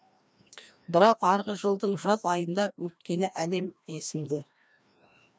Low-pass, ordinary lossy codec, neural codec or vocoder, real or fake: none; none; codec, 16 kHz, 1 kbps, FreqCodec, larger model; fake